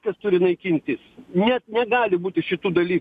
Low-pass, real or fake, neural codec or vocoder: 14.4 kHz; real; none